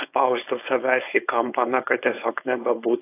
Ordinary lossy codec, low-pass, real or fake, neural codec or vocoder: AAC, 24 kbps; 3.6 kHz; fake; codec, 16 kHz, 4.8 kbps, FACodec